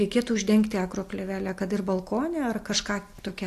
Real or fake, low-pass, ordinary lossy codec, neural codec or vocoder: real; 14.4 kHz; AAC, 64 kbps; none